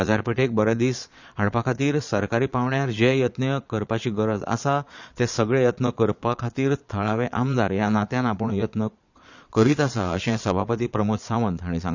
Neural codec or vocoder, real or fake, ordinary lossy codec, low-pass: vocoder, 22.05 kHz, 80 mel bands, Vocos; fake; none; 7.2 kHz